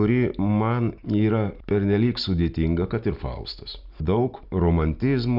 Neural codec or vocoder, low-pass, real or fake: none; 5.4 kHz; real